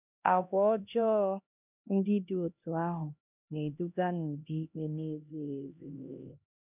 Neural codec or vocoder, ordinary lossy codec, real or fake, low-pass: codec, 16 kHz, 1 kbps, X-Codec, HuBERT features, trained on LibriSpeech; none; fake; 3.6 kHz